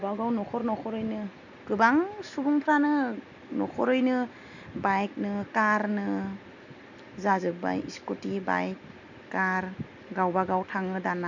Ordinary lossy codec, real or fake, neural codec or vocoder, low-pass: none; real; none; 7.2 kHz